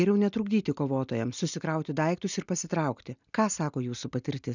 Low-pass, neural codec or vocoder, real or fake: 7.2 kHz; none; real